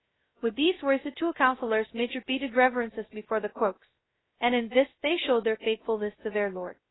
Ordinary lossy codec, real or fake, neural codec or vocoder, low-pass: AAC, 16 kbps; fake; codec, 16 kHz, 0.2 kbps, FocalCodec; 7.2 kHz